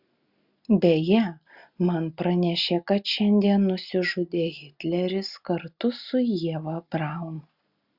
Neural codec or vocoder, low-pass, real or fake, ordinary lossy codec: none; 5.4 kHz; real; Opus, 64 kbps